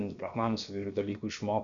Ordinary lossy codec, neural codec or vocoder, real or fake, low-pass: MP3, 96 kbps; codec, 16 kHz, about 1 kbps, DyCAST, with the encoder's durations; fake; 7.2 kHz